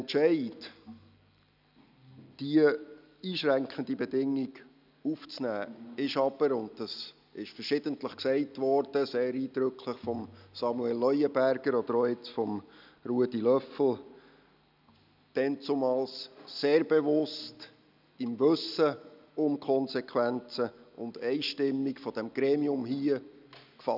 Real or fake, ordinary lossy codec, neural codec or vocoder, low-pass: real; none; none; 5.4 kHz